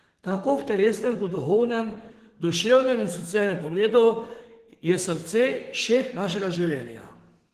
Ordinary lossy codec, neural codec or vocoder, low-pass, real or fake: Opus, 16 kbps; codec, 32 kHz, 1.9 kbps, SNAC; 14.4 kHz; fake